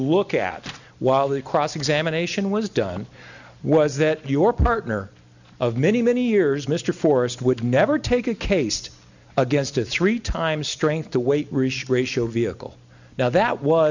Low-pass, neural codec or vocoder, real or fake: 7.2 kHz; none; real